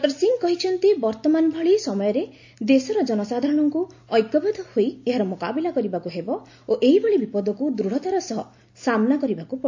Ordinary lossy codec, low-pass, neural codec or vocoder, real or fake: AAC, 48 kbps; 7.2 kHz; none; real